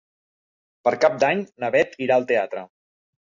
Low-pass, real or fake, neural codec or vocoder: 7.2 kHz; real; none